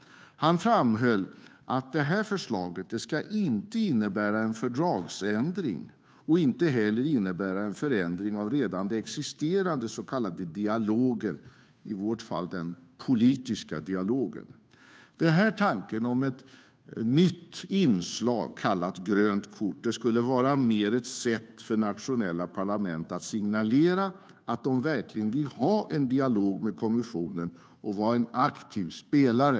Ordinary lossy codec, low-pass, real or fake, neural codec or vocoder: none; none; fake; codec, 16 kHz, 2 kbps, FunCodec, trained on Chinese and English, 25 frames a second